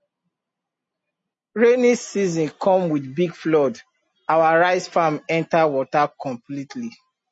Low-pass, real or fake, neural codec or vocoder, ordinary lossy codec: 10.8 kHz; real; none; MP3, 32 kbps